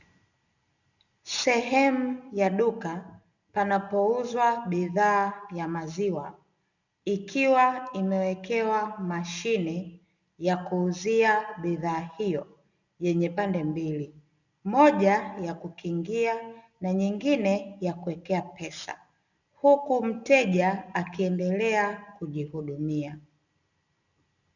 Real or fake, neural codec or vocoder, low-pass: real; none; 7.2 kHz